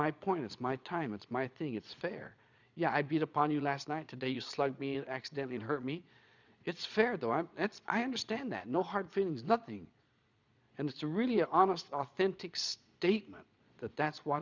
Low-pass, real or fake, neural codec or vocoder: 7.2 kHz; fake; vocoder, 22.05 kHz, 80 mel bands, Vocos